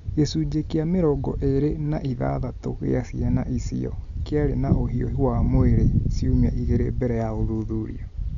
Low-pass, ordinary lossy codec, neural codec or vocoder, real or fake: 7.2 kHz; none; none; real